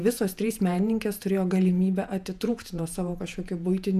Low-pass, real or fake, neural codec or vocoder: 14.4 kHz; fake; vocoder, 48 kHz, 128 mel bands, Vocos